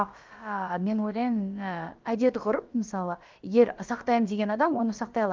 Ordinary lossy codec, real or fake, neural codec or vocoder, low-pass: Opus, 32 kbps; fake; codec, 16 kHz, about 1 kbps, DyCAST, with the encoder's durations; 7.2 kHz